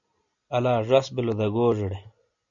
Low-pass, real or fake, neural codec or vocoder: 7.2 kHz; real; none